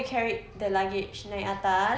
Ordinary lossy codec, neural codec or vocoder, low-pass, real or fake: none; none; none; real